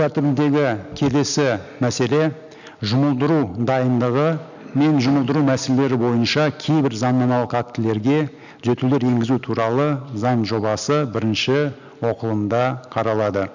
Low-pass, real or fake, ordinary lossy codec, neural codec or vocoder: 7.2 kHz; real; none; none